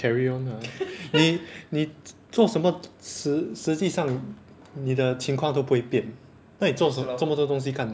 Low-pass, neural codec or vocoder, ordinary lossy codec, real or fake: none; none; none; real